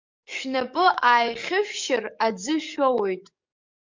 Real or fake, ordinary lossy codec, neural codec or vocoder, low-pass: fake; MP3, 64 kbps; codec, 44.1 kHz, 7.8 kbps, DAC; 7.2 kHz